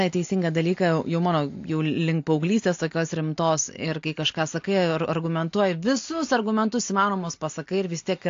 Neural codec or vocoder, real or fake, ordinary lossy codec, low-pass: none; real; AAC, 48 kbps; 7.2 kHz